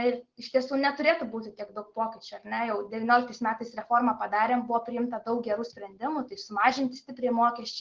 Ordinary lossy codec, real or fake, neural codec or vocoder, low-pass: Opus, 16 kbps; real; none; 7.2 kHz